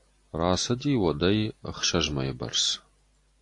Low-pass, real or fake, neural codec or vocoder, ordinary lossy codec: 10.8 kHz; real; none; AAC, 48 kbps